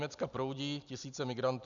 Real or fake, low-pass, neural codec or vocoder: real; 7.2 kHz; none